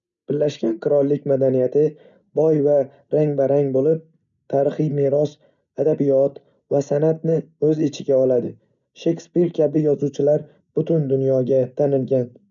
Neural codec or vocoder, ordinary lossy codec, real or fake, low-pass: none; none; real; 7.2 kHz